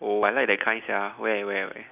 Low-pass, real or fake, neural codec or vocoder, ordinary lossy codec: 3.6 kHz; real; none; none